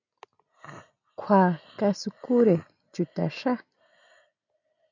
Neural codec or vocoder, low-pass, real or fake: none; 7.2 kHz; real